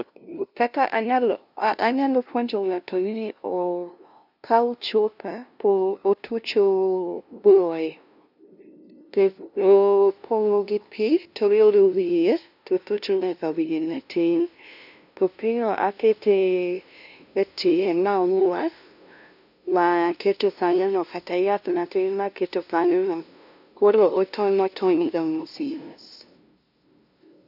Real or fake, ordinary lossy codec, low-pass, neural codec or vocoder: fake; none; 5.4 kHz; codec, 16 kHz, 0.5 kbps, FunCodec, trained on LibriTTS, 25 frames a second